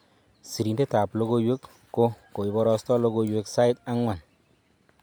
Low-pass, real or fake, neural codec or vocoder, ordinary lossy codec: none; real; none; none